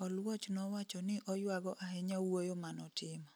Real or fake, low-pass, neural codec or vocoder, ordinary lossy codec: real; none; none; none